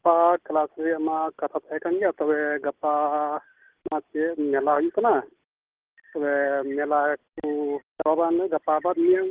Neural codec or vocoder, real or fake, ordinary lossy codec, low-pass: none; real; Opus, 16 kbps; 3.6 kHz